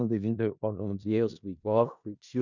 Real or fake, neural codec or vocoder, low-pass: fake; codec, 16 kHz in and 24 kHz out, 0.4 kbps, LongCat-Audio-Codec, four codebook decoder; 7.2 kHz